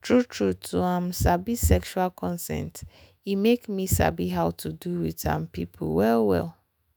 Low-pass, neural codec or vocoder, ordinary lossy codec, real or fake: none; autoencoder, 48 kHz, 128 numbers a frame, DAC-VAE, trained on Japanese speech; none; fake